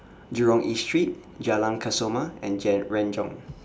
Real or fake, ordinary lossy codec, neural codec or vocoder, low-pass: real; none; none; none